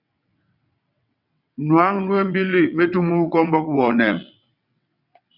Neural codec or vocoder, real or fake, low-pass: vocoder, 22.05 kHz, 80 mel bands, WaveNeXt; fake; 5.4 kHz